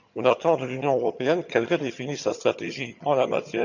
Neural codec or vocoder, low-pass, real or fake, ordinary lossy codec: vocoder, 22.05 kHz, 80 mel bands, HiFi-GAN; 7.2 kHz; fake; none